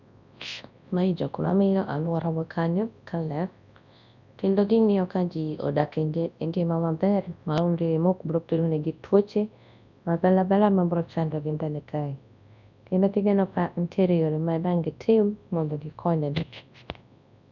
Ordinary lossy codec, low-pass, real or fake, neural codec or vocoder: none; 7.2 kHz; fake; codec, 24 kHz, 0.9 kbps, WavTokenizer, large speech release